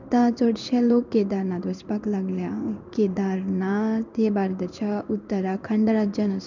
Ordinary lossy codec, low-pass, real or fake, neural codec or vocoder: none; 7.2 kHz; fake; codec, 16 kHz in and 24 kHz out, 1 kbps, XY-Tokenizer